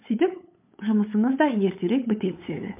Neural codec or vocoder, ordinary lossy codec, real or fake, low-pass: codec, 16 kHz, 8 kbps, FunCodec, trained on LibriTTS, 25 frames a second; MP3, 32 kbps; fake; 3.6 kHz